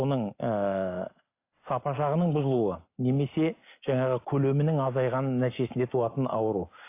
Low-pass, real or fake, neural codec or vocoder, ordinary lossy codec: 3.6 kHz; real; none; AAC, 24 kbps